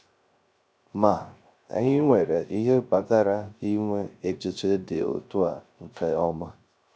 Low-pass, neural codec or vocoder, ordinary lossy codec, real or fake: none; codec, 16 kHz, 0.3 kbps, FocalCodec; none; fake